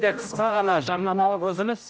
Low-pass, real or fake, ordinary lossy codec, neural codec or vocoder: none; fake; none; codec, 16 kHz, 0.5 kbps, X-Codec, HuBERT features, trained on general audio